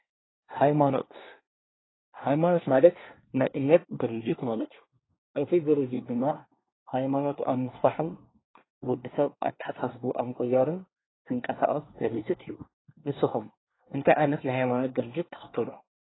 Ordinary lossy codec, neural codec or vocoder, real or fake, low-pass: AAC, 16 kbps; codec, 24 kHz, 1 kbps, SNAC; fake; 7.2 kHz